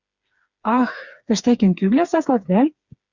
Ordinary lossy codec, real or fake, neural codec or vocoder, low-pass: Opus, 64 kbps; fake; codec, 16 kHz, 4 kbps, FreqCodec, smaller model; 7.2 kHz